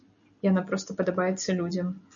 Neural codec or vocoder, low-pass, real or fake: none; 7.2 kHz; real